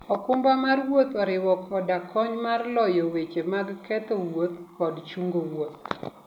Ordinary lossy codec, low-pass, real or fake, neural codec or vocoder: none; 19.8 kHz; real; none